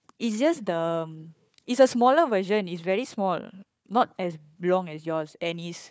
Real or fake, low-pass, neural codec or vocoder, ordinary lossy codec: fake; none; codec, 16 kHz, 4 kbps, FunCodec, trained on Chinese and English, 50 frames a second; none